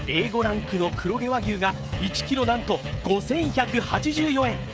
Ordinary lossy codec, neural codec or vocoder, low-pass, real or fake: none; codec, 16 kHz, 16 kbps, FreqCodec, smaller model; none; fake